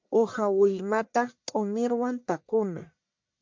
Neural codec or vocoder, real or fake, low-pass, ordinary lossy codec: codec, 44.1 kHz, 1.7 kbps, Pupu-Codec; fake; 7.2 kHz; MP3, 64 kbps